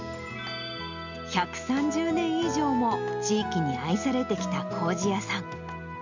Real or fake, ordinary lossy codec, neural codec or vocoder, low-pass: real; none; none; 7.2 kHz